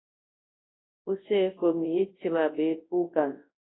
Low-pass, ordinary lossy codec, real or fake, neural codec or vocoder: 7.2 kHz; AAC, 16 kbps; fake; codec, 24 kHz, 0.9 kbps, WavTokenizer, large speech release